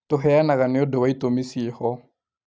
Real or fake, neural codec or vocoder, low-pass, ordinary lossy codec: real; none; none; none